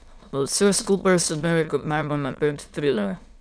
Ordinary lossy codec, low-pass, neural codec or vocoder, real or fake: none; none; autoencoder, 22.05 kHz, a latent of 192 numbers a frame, VITS, trained on many speakers; fake